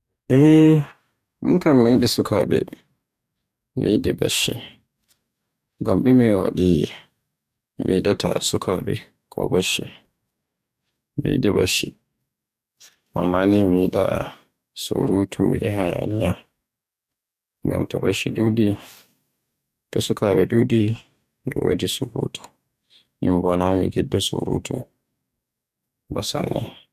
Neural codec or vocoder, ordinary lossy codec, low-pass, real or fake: codec, 44.1 kHz, 2.6 kbps, DAC; none; 14.4 kHz; fake